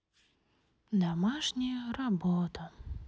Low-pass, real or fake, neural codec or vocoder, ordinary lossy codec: none; real; none; none